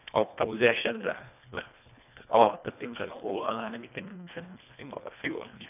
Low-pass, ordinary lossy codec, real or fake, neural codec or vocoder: 3.6 kHz; none; fake; codec, 24 kHz, 1.5 kbps, HILCodec